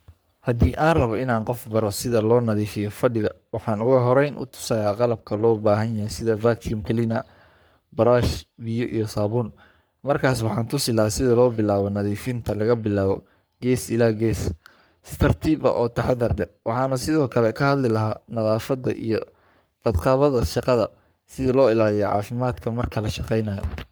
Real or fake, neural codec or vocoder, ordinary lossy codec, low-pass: fake; codec, 44.1 kHz, 3.4 kbps, Pupu-Codec; none; none